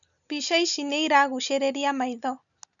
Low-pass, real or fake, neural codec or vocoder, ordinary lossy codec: 7.2 kHz; real; none; none